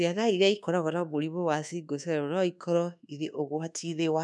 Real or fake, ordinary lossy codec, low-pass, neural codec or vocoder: fake; none; none; codec, 24 kHz, 1.2 kbps, DualCodec